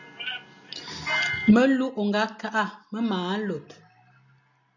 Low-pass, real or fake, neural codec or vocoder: 7.2 kHz; real; none